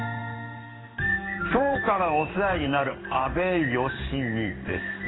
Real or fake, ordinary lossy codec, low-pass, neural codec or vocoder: fake; AAC, 16 kbps; 7.2 kHz; codec, 16 kHz in and 24 kHz out, 1 kbps, XY-Tokenizer